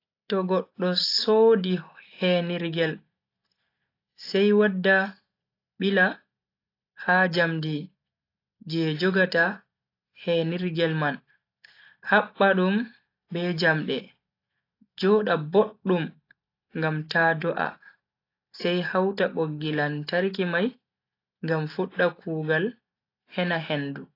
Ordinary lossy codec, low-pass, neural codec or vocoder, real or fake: AAC, 32 kbps; 5.4 kHz; none; real